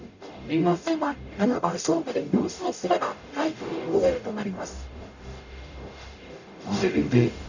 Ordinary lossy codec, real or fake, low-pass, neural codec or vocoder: none; fake; 7.2 kHz; codec, 44.1 kHz, 0.9 kbps, DAC